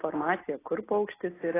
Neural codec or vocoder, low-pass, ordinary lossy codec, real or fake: none; 3.6 kHz; AAC, 16 kbps; real